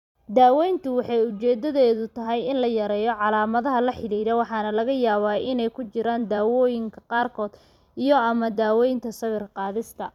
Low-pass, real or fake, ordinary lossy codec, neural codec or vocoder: 19.8 kHz; fake; none; vocoder, 44.1 kHz, 128 mel bands every 256 samples, BigVGAN v2